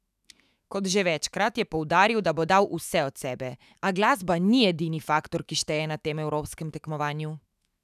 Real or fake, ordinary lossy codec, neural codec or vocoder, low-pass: fake; none; autoencoder, 48 kHz, 128 numbers a frame, DAC-VAE, trained on Japanese speech; 14.4 kHz